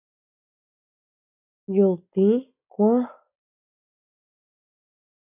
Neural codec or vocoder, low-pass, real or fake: none; 3.6 kHz; real